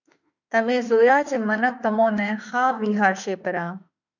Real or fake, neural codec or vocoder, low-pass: fake; autoencoder, 48 kHz, 32 numbers a frame, DAC-VAE, trained on Japanese speech; 7.2 kHz